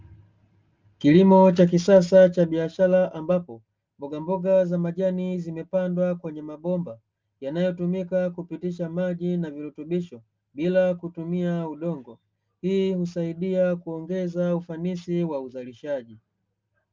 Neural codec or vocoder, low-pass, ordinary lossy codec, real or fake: none; 7.2 kHz; Opus, 24 kbps; real